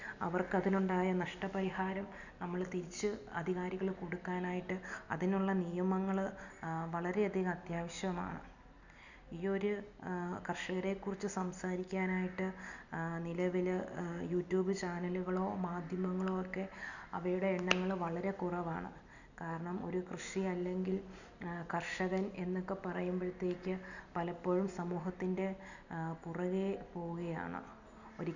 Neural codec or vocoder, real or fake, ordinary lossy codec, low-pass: none; real; none; 7.2 kHz